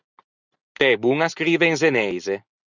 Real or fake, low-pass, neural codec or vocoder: real; 7.2 kHz; none